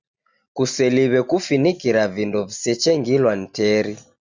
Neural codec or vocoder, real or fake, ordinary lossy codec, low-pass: none; real; Opus, 64 kbps; 7.2 kHz